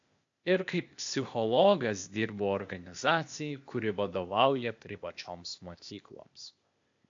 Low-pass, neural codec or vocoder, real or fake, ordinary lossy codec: 7.2 kHz; codec, 16 kHz, 0.8 kbps, ZipCodec; fake; AAC, 64 kbps